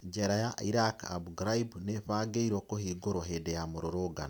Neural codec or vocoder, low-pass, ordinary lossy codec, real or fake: none; none; none; real